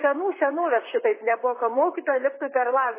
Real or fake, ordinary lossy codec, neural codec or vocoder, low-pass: fake; MP3, 16 kbps; vocoder, 44.1 kHz, 128 mel bands, Pupu-Vocoder; 3.6 kHz